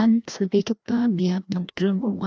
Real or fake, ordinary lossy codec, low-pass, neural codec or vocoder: fake; none; none; codec, 16 kHz, 1 kbps, FreqCodec, larger model